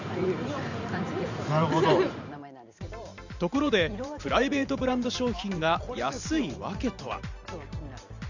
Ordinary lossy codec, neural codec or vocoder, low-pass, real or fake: none; vocoder, 44.1 kHz, 128 mel bands every 512 samples, BigVGAN v2; 7.2 kHz; fake